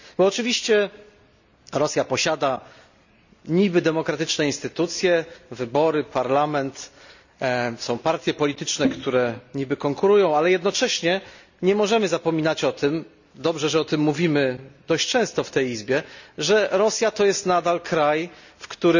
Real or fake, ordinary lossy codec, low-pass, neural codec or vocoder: real; none; 7.2 kHz; none